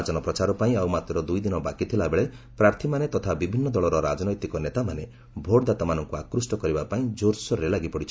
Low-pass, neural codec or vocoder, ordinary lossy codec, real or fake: none; none; none; real